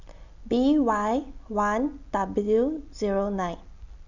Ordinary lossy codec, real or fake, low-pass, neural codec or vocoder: none; real; 7.2 kHz; none